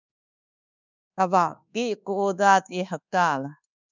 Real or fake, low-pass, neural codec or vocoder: fake; 7.2 kHz; codec, 24 kHz, 1.2 kbps, DualCodec